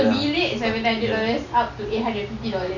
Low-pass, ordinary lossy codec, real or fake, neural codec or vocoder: 7.2 kHz; none; real; none